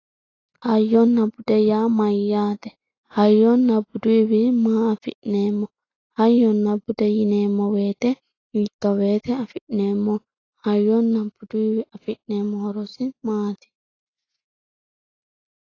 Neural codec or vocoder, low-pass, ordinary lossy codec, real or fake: none; 7.2 kHz; AAC, 32 kbps; real